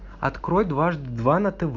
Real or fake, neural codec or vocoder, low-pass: real; none; 7.2 kHz